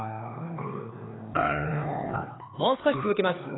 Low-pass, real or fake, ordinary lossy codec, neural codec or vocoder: 7.2 kHz; fake; AAC, 16 kbps; codec, 16 kHz, 4 kbps, X-Codec, HuBERT features, trained on LibriSpeech